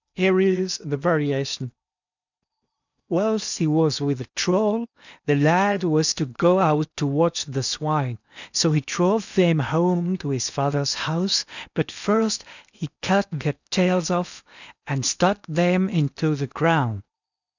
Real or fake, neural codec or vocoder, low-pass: fake; codec, 16 kHz in and 24 kHz out, 0.8 kbps, FocalCodec, streaming, 65536 codes; 7.2 kHz